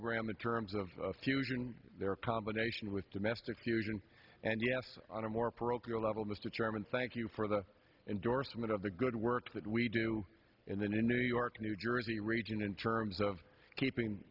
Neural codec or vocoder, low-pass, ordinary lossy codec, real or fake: none; 5.4 kHz; Opus, 32 kbps; real